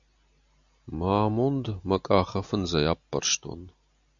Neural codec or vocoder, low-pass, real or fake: none; 7.2 kHz; real